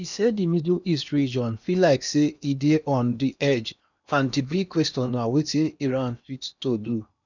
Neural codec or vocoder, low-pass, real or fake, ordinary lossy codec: codec, 16 kHz in and 24 kHz out, 0.8 kbps, FocalCodec, streaming, 65536 codes; 7.2 kHz; fake; none